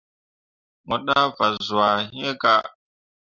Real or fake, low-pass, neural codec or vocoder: real; 7.2 kHz; none